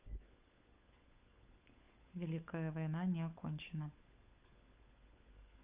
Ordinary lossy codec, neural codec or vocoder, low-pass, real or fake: Opus, 64 kbps; codec, 16 kHz, 16 kbps, FunCodec, trained on LibriTTS, 50 frames a second; 3.6 kHz; fake